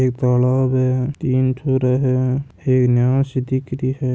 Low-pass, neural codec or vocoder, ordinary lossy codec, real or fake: none; none; none; real